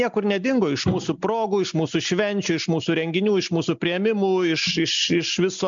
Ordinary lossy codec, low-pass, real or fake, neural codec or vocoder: MP3, 64 kbps; 7.2 kHz; real; none